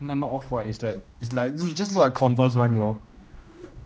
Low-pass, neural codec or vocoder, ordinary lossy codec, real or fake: none; codec, 16 kHz, 1 kbps, X-Codec, HuBERT features, trained on general audio; none; fake